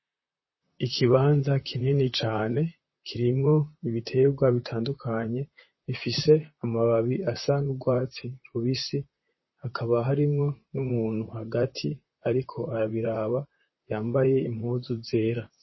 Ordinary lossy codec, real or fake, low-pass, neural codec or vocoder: MP3, 24 kbps; fake; 7.2 kHz; vocoder, 44.1 kHz, 128 mel bands, Pupu-Vocoder